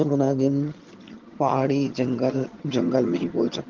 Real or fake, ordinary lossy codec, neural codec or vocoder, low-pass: fake; Opus, 16 kbps; vocoder, 22.05 kHz, 80 mel bands, HiFi-GAN; 7.2 kHz